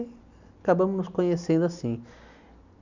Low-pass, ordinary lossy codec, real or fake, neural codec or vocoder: 7.2 kHz; none; real; none